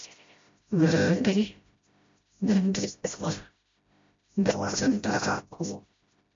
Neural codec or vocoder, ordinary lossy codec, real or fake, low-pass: codec, 16 kHz, 0.5 kbps, FreqCodec, smaller model; MP3, 48 kbps; fake; 7.2 kHz